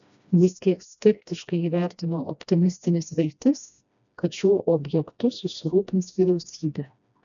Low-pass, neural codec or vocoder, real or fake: 7.2 kHz; codec, 16 kHz, 1 kbps, FreqCodec, smaller model; fake